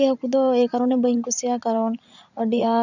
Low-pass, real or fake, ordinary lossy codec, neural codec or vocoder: 7.2 kHz; fake; none; vocoder, 44.1 kHz, 80 mel bands, Vocos